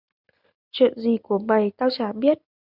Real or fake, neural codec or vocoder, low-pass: real; none; 5.4 kHz